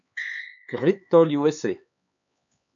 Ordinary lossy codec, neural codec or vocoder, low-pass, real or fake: MP3, 96 kbps; codec, 16 kHz, 4 kbps, X-Codec, HuBERT features, trained on LibriSpeech; 7.2 kHz; fake